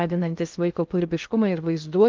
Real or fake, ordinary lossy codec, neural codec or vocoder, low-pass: fake; Opus, 32 kbps; codec, 16 kHz in and 24 kHz out, 0.6 kbps, FocalCodec, streaming, 2048 codes; 7.2 kHz